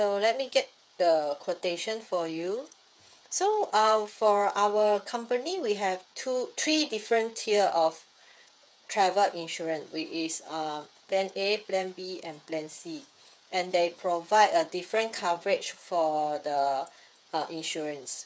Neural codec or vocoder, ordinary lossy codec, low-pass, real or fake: codec, 16 kHz, 8 kbps, FreqCodec, smaller model; none; none; fake